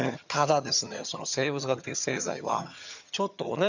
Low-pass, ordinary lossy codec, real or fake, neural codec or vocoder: 7.2 kHz; none; fake; vocoder, 22.05 kHz, 80 mel bands, HiFi-GAN